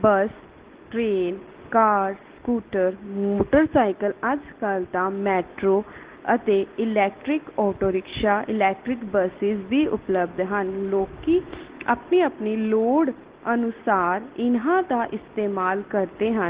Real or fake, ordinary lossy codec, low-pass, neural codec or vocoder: real; Opus, 16 kbps; 3.6 kHz; none